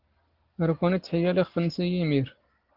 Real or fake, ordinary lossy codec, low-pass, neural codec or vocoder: real; Opus, 16 kbps; 5.4 kHz; none